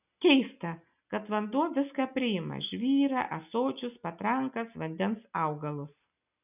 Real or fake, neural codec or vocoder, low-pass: real; none; 3.6 kHz